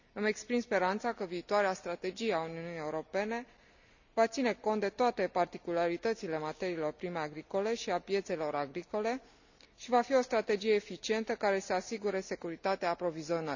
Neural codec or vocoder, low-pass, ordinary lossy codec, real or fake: none; 7.2 kHz; none; real